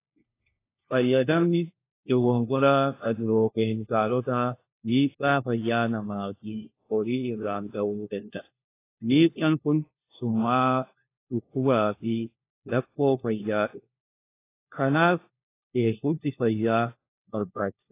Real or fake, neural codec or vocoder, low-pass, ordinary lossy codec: fake; codec, 16 kHz, 1 kbps, FunCodec, trained on LibriTTS, 50 frames a second; 3.6 kHz; AAC, 24 kbps